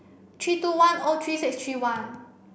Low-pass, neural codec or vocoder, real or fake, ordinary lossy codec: none; none; real; none